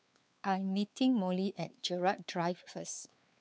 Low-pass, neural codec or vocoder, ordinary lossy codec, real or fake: none; codec, 16 kHz, 4 kbps, X-Codec, WavLM features, trained on Multilingual LibriSpeech; none; fake